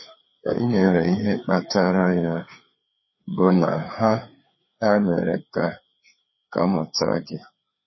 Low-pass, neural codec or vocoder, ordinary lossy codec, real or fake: 7.2 kHz; codec, 16 kHz, 4 kbps, FreqCodec, larger model; MP3, 24 kbps; fake